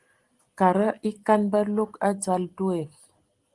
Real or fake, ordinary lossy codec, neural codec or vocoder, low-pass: real; Opus, 24 kbps; none; 10.8 kHz